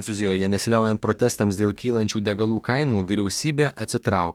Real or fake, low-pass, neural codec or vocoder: fake; 19.8 kHz; codec, 44.1 kHz, 2.6 kbps, DAC